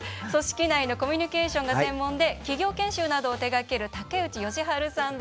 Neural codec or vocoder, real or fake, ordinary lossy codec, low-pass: none; real; none; none